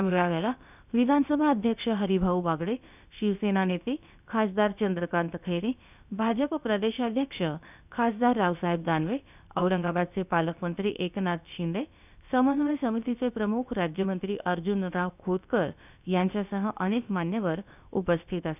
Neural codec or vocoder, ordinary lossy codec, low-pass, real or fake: codec, 16 kHz, about 1 kbps, DyCAST, with the encoder's durations; none; 3.6 kHz; fake